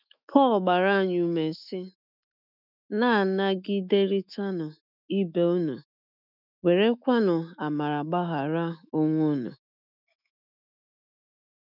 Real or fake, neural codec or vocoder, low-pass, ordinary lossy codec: fake; autoencoder, 48 kHz, 128 numbers a frame, DAC-VAE, trained on Japanese speech; 5.4 kHz; none